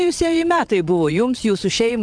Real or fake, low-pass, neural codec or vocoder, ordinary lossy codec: fake; 9.9 kHz; vocoder, 22.05 kHz, 80 mel bands, WaveNeXt; MP3, 96 kbps